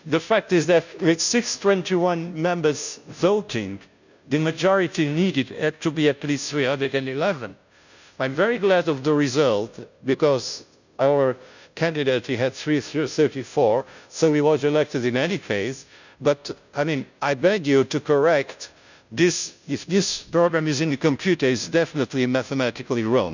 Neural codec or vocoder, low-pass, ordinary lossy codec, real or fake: codec, 16 kHz, 0.5 kbps, FunCodec, trained on Chinese and English, 25 frames a second; 7.2 kHz; none; fake